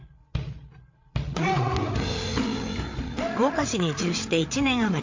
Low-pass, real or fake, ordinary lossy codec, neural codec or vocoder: 7.2 kHz; fake; MP3, 48 kbps; codec, 16 kHz, 8 kbps, FreqCodec, larger model